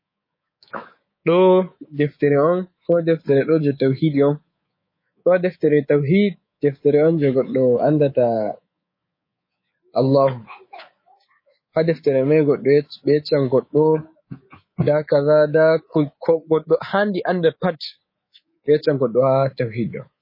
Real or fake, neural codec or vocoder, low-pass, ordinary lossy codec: fake; codec, 24 kHz, 3.1 kbps, DualCodec; 5.4 kHz; MP3, 24 kbps